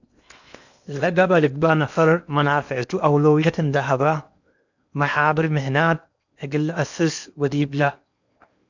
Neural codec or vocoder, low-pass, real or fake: codec, 16 kHz in and 24 kHz out, 0.8 kbps, FocalCodec, streaming, 65536 codes; 7.2 kHz; fake